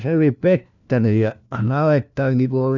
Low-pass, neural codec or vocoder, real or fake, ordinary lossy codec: 7.2 kHz; codec, 16 kHz, 1 kbps, FunCodec, trained on LibriTTS, 50 frames a second; fake; none